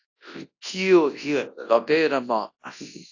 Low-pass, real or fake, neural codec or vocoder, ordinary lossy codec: 7.2 kHz; fake; codec, 24 kHz, 0.9 kbps, WavTokenizer, large speech release; AAC, 48 kbps